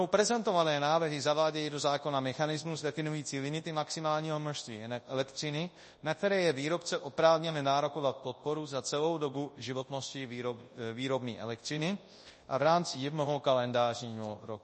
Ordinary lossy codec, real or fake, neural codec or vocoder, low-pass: MP3, 32 kbps; fake; codec, 24 kHz, 0.9 kbps, WavTokenizer, large speech release; 10.8 kHz